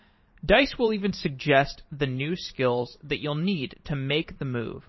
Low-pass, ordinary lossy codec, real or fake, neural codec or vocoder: 7.2 kHz; MP3, 24 kbps; fake; vocoder, 44.1 kHz, 128 mel bands every 512 samples, BigVGAN v2